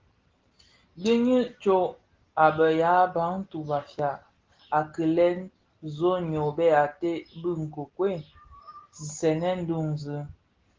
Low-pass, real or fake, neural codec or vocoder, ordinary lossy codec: 7.2 kHz; real; none; Opus, 16 kbps